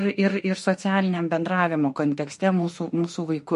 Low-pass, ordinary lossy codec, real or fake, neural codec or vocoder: 14.4 kHz; MP3, 48 kbps; fake; autoencoder, 48 kHz, 32 numbers a frame, DAC-VAE, trained on Japanese speech